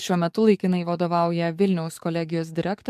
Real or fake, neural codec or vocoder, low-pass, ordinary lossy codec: fake; codec, 44.1 kHz, 7.8 kbps, DAC; 14.4 kHz; MP3, 96 kbps